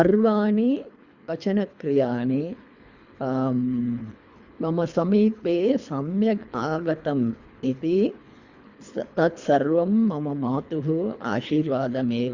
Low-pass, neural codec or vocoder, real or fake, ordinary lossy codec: 7.2 kHz; codec, 24 kHz, 3 kbps, HILCodec; fake; Opus, 64 kbps